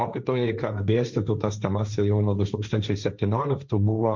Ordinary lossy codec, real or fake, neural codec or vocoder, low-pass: Opus, 64 kbps; fake; codec, 16 kHz, 1.1 kbps, Voila-Tokenizer; 7.2 kHz